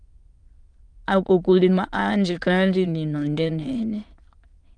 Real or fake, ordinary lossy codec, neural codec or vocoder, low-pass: fake; Opus, 32 kbps; autoencoder, 22.05 kHz, a latent of 192 numbers a frame, VITS, trained on many speakers; 9.9 kHz